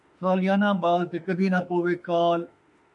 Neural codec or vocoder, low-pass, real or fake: autoencoder, 48 kHz, 32 numbers a frame, DAC-VAE, trained on Japanese speech; 10.8 kHz; fake